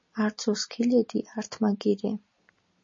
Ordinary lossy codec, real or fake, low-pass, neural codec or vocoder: MP3, 32 kbps; real; 7.2 kHz; none